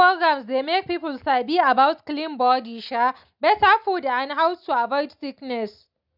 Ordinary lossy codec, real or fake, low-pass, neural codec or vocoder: none; real; 5.4 kHz; none